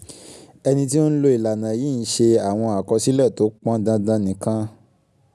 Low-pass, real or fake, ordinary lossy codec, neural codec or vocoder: none; real; none; none